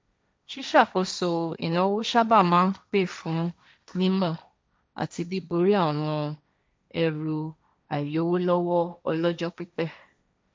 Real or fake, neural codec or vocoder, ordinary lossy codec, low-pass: fake; codec, 16 kHz, 1.1 kbps, Voila-Tokenizer; none; none